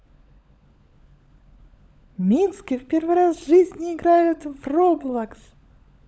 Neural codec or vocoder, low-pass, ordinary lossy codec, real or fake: codec, 16 kHz, 16 kbps, FunCodec, trained on LibriTTS, 50 frames a second; none; none; fake